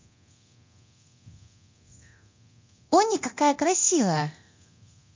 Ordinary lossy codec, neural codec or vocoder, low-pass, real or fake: MP3, 48 kbps; codec, 24 kHz, 0.9 kbps, DualCodec; 7.2 kHz; fake